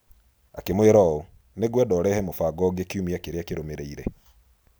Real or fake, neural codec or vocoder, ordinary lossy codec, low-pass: real; none; none; none